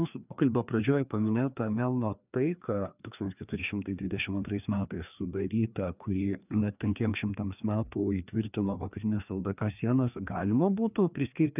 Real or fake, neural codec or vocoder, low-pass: fake; codec, 16 kHz, 2 kbps, FreqCodec, larger model; 3.6 kHz